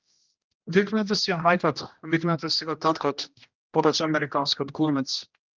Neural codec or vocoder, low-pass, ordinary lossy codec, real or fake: codec, 16 kHz, 1 kbps, X-Codec, HuBERT features, trained on general audio; 7.2 kHz; Opus, 24 kbps; fake